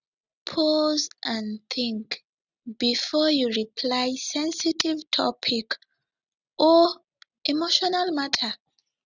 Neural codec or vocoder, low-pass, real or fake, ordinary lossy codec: none; 7.2 kHz; real; none